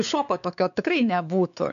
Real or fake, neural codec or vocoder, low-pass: fake; codec, 16 kHz, 4 kbps, X-Codec, WavLM features, trained on Multilingual LibriSpeech; 7.2 kHz